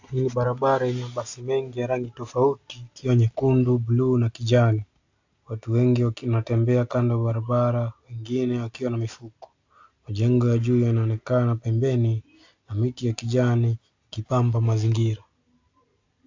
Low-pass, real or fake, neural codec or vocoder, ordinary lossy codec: 7.2 kHz; real; none; AAC, 48 kbps